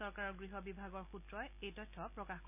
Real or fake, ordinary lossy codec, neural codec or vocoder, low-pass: real; none; none; 3.6 kHz